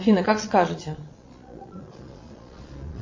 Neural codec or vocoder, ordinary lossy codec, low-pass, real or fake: vocoder, 22.05 kHz, 80 mel bands, Vocos; MP3, 32 kbps; 7.2 kHz; fake